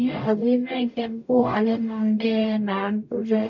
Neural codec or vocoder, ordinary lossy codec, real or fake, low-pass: codec, 44.1 kHz, 0.9 kbps, DAC; MP3, 48 kbps; fake; 7.2 kHz